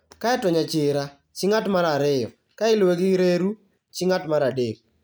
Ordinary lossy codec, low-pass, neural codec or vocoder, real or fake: none; none; none; real